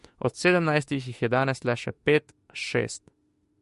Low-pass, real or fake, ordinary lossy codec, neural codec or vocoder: 14.4 kHz; fake; MP3, 48 kbps; autoencoder, 48 kHz, 32 numbers a frame, DAC-VAE, trained on Japanese speech